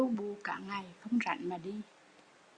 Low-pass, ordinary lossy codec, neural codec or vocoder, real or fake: 9.9 kHz; Opus, 64 kbps; none; real